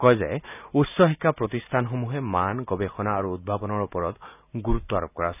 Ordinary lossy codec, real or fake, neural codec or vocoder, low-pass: none; real; none; 3.6 kHz